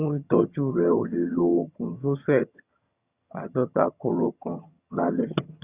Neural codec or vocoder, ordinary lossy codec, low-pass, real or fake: vocoder, 22.05 kHz, 80 mel bands, HiFi-GAN; Opus, 64 kbps; 3.6 kHz; fake